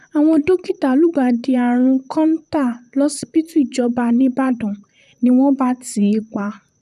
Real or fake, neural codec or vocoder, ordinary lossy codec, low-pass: fake; vocoder, 44.1 kHz, 128 mel bands, Pupu-Vocoder; none; 14.4 kHz